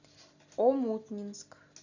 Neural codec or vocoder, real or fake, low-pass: none; real; 7.2 kHz